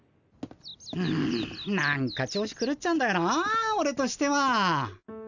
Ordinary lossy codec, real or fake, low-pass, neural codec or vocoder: none; real; 7.2 kHz; none